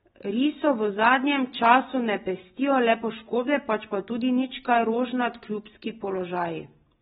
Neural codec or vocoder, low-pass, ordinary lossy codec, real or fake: none; 19.8 kHz; AAC, 16 kbps; real